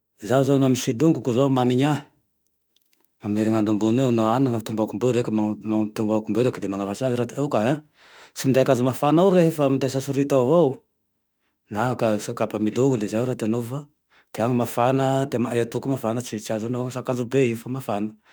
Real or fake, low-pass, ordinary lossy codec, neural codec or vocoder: fake; none; none; autoencoder, 48 kHz, 32 numbers a frame, DAC-VAE, trained on Japanese speech